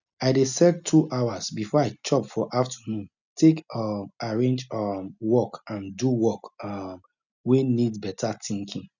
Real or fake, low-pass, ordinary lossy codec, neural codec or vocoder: real; 7.2 kHz; none; none